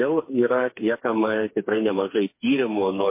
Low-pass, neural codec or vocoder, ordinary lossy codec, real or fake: 3.6 kHz; codec, 16 kHz, 4 kbps, FreqCodec, smaller model; MP3, 24 kbps; fake